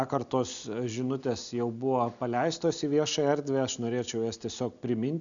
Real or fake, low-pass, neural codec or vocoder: real; 7.2 kHz; none